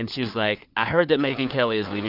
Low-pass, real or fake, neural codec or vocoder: 5.4 kHz; fake; codec, 16 kHz, 4 kbps, FunCodec, trained on LibriTTS, 50 frames a second